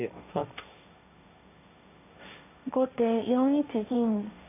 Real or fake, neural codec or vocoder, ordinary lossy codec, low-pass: fake; codec, 16 kHz, 1.1 kbps, Voila-Tokenizer; none; 3.6 kHz